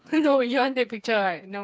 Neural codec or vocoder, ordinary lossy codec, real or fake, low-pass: codec, 16 kHz, 4 kbps, FreqCodec, smaller model; none; fake; none